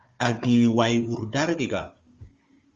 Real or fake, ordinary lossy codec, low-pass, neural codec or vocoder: fake; Opus, 32 kbps; 7.2 kHz; codec, 16 kHz, 4 kbps, FunCodec, trained on Chinese and English, 50 frames a second